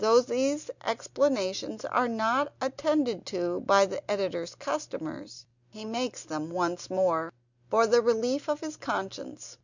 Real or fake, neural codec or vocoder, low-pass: real; none; 7.2 kHz